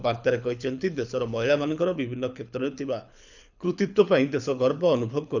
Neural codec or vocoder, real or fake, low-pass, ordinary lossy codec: codec, 24 kHz, 6 kbps, HILCodec; fake; 7.2 kHz; none